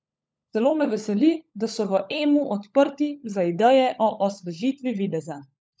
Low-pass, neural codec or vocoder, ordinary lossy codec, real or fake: none; codec, 16 kHz, 16 kbps, FunCodec, trained on LibriTTS, 50 frames a second; none; fake